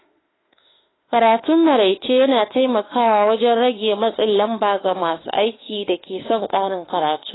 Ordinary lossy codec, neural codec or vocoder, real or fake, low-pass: AAC, 16 kbps; autoencoder, 48 kHz, 32 numbers a frame, DAC-VAE, trained on Japanese speech; fake; 7.2 kHz